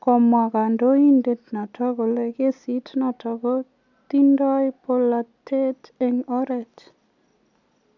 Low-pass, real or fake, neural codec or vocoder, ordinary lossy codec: 7.2 kHz; real; none; none